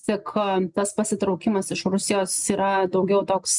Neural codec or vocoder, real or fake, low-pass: none; real; 10.8 kHz